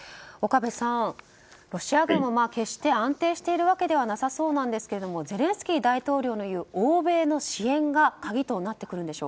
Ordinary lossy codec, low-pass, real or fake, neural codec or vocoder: none; none; real; none